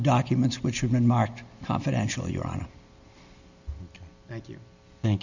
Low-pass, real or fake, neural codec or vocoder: 7.2 kHz; real; none